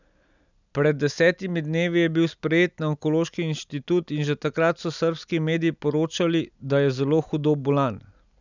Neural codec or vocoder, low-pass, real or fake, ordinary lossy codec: none; 7.2 kHz; real; none